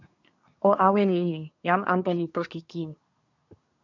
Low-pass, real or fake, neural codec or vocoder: 7.2 kHz; fake; codec, 24 kHz, 1 kbps, SNAC